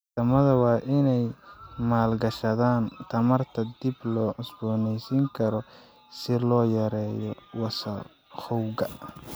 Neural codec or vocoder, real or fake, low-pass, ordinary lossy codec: none; real; none; none